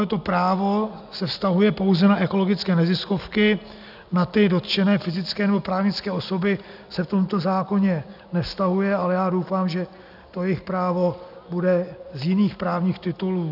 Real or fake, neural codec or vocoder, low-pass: real; none; 5.4 kHz